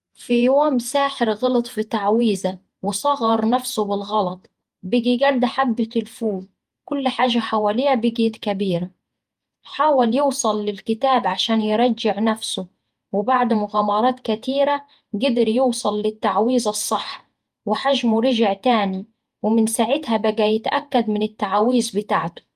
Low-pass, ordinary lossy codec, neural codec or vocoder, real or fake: 14.4 kHz; Opus, 32 kbps; vocoder, 48 kHz, 128 mel bands, Vocos; fake